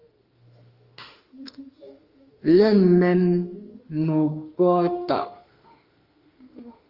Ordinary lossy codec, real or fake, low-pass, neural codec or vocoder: Opus, 24 kbps; fake; 5.4 kHz; autoencoder, 48 kHz, 32 numbers a frame, DAC-VAE, trained on Japanese speech